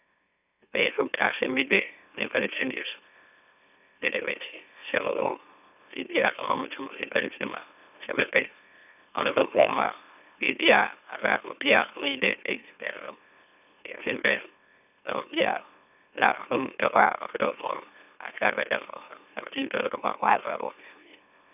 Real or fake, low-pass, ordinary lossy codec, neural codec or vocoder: fake; 3.6 kHz; none; autoencoder, 44.1 kHz, a latent of 192 numbers a frame, MeloTTS